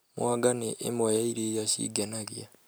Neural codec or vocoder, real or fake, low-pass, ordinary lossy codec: none; real; none; none